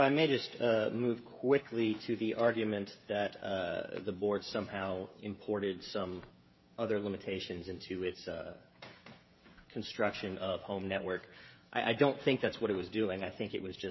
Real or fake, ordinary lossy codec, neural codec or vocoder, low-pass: fake; MP3, 24 kbps; codec, 16 kHz, 16 kbps, FreqCodec, smaller model; 7.2 kHz